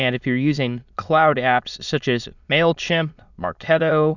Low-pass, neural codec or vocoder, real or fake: 7.2 kHz; autoencoder, 22.05 kHz, a latent of 192 numbers a frame, VITS, trained on many speakers; fake